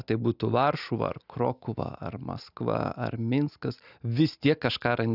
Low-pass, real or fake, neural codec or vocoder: 5.4 kHz; real; none